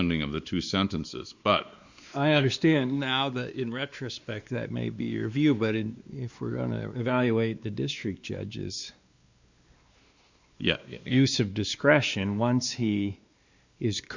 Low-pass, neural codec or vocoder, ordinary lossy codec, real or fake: 7.2 kHz; codec, 16 kHz, 2 kbps, X-Codec, WavLM features, trained on Multilingual LibriSpeech; Opus, 64 kbps; fake